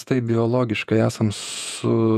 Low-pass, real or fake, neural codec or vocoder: 14.4 kHz; fake; vocoder, 48 kHz, 128 mel bands, Vocos